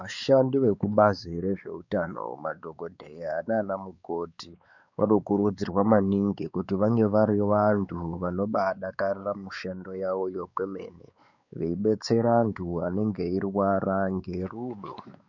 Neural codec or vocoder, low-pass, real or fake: codec, 16 kHz, 4 kbps, X-Codec, WavLM features, trained on Multilingual LibriSpeech; 7.2 kHz; fake